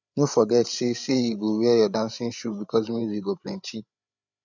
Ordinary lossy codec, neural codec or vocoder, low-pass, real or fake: none; codec, 16 kHz, 8 kbps, FreqCodec, larger model; 7.2 kHz; fake